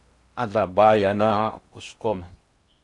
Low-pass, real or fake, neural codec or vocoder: 10.8 kHz; fake; codec, 16 kHz in and 24 kHz out, 0.8 kbps, FocalCodec, streaming, 65536 codes